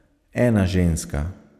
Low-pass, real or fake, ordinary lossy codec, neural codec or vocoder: 14.4 kHz; real; none; none